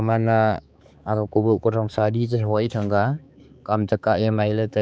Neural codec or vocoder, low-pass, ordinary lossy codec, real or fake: codec, 16 kHz, 4 kbps, X-Codec, HuBERT features, trained on balanced general audio; none; none; fake